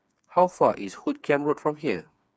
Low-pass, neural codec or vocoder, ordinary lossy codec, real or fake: none; codec, 16 kHz, 4 kbps, FreqCodec, smaller model; none; fake